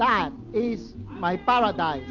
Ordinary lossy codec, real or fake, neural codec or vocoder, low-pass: MP3, 48 kbps; real; none; 7.2 kHz